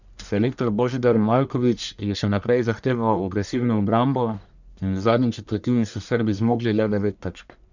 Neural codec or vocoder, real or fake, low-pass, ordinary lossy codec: codec, 44.1 kHz, 1.7 kbps, Pupu-Codec; fake; 7.2 kHz; none